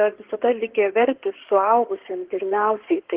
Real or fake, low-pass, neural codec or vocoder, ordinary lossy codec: fake; 3.6 kHz; codec, 16 kHz in and 24 kHz out, 2.2 kbps, FireRedTTS-2 codec; Opus, 16 kbps